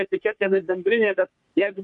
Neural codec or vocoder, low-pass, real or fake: codec, 44.1 kHz, 3.4 kbps, Pupu-Codec; 10.8 kHz; fake